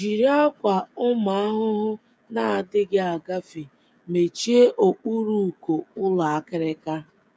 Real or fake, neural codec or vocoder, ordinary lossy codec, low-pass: fake; codec, 16 kHz, 16 kbps, FreqCodec, smaller model; none; none